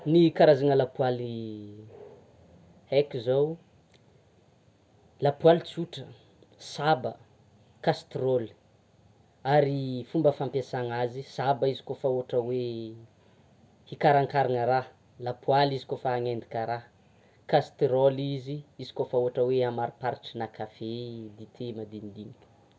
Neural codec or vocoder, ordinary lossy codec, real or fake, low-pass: none; none; real; none